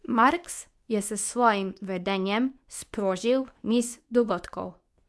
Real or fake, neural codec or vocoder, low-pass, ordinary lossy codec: fake; codec, 24 kHz, 0.9 kbps, WavTokenizer, medium speech release version 2; none; none